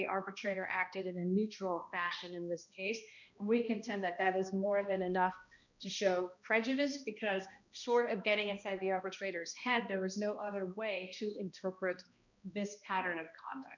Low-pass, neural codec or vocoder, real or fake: 7.2 kHz; codec, 16 kHz, 1 kbps, X-Codec, HuBERT features, trained on balanced general audio; fake